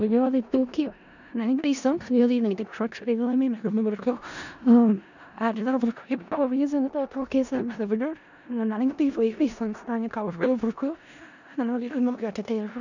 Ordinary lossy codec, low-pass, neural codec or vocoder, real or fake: none; 7.2 kHz; codec, 16 kHz in and 24 kHz out, 0.4 kbps, LongCat-Audio-Codec, four codebook decoder; fake